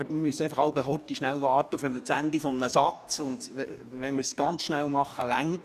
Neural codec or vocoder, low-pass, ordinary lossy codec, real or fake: codec, 44.1 kHz, 2.6 kbps, DAC; 14.4 kHz; none; fake